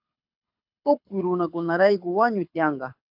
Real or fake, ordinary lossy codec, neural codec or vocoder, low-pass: fake; AAC, 48 kbps; codec, 24 kHz, 6 kbps, HILCodec; 5.4 kHz